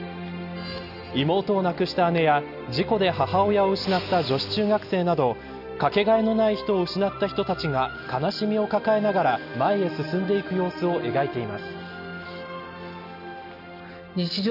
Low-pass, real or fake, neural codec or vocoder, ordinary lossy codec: 5.4 kHz; real; none; none